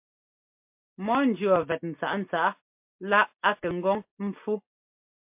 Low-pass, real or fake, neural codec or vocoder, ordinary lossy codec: 3.6 kHz; real; none; MP3, 32 kbps